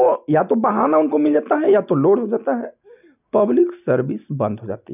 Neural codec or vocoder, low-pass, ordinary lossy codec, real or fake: none; 3.6 kHz; none; real